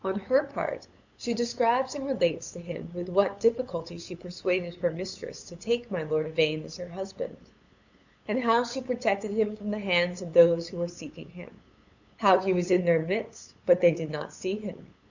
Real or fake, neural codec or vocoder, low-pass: fake; codec, 16 kHz, 8 kbps, FunCodec, trained on LibriTTS, 25 frames a second; 7.2 kHz